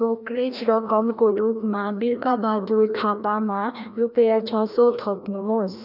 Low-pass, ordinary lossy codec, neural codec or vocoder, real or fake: 5.4 kHz; none; codec, 16 kHz, 1 kbps, FreqCodec, larger model; fake